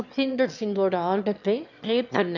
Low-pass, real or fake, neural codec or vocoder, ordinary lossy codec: 7.2 kHz; fake; autoencoder, 22.05 kHz, a latent of 192 numbers a frame, VITS, trained on one speaker; none